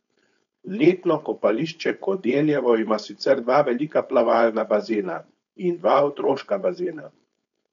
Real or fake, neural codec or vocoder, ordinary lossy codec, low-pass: fake; codec, 16 kHz, 4.8 kbps, FACodec; none; 7.2 kHz